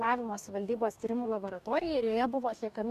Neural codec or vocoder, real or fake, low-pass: codec, 44.1 kHz, 2.6 kbps, DAC; fake; 14.4 kHz